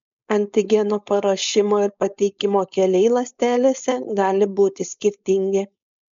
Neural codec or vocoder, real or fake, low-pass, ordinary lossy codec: codec, 16 kHz, 4.8 kbps, FACodec; fake; 7.2 kHz; MP3, 64 kbps